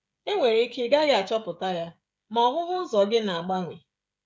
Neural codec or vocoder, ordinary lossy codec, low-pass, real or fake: codec, 16 kHz, 16 kbps, FreqCodec, smaller model; none; none; fake